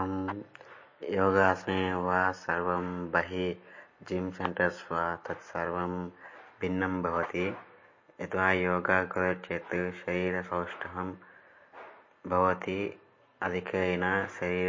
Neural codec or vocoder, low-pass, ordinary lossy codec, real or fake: none; 7.2 kHz; MP3, 32 kbps; real